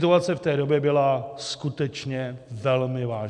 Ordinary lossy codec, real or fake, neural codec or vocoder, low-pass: MP3, 96 kbps; real; none; 9.9 kHz